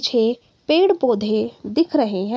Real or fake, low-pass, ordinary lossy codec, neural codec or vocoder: real; none; none; none